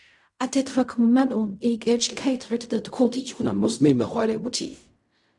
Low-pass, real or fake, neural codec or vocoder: 10.8 kHz; fake; codec, 16 kHz in and 24 kHz out, 0.4 kbps, LongCat-Audio-Codec, fine tuned four codebook decoder